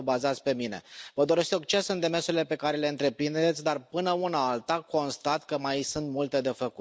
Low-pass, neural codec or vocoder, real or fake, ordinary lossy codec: none; none; real; none